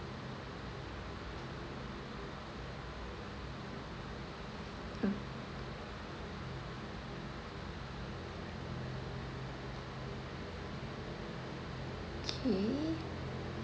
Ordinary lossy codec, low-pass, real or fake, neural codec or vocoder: none; none; real; none